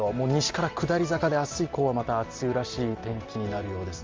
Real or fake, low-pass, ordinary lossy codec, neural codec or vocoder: real; 7.2 kHz; Opus, 32 kbps; none